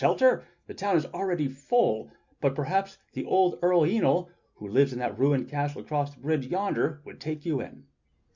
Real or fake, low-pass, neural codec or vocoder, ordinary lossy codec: real; 7.2 kHz; none; Opus, 64 kbps